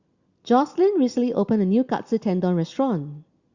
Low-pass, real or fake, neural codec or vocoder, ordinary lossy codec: 7.2 kHz; real; none; Opus, 64 kbps